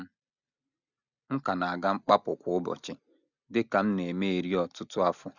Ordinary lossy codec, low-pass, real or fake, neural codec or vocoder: none; 7.2 kHz; real; none